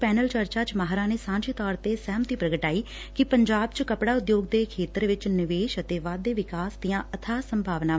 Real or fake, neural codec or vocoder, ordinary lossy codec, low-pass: real; none; none; none